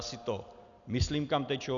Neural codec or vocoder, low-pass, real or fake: none; 7.2 kHz; real